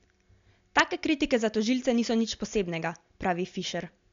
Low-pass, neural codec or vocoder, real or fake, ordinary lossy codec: 7.2 kHz; none; real; MP3, 64 kbps